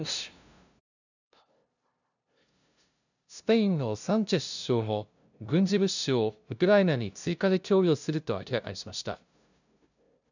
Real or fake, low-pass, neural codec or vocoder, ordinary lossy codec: fake; 7.2 kHz; codec, 16 kHz, 0.5 kbps, FunCodec, trained on LibriTTS, 25 frames a second; none